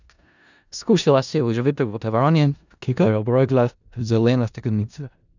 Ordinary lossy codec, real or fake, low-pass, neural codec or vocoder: none; fake; 7.2 kHz; codec, 16 kHz in and 24 kHz out, 0.4 kbps, LongCat-Audio-Codec, four codebook decoder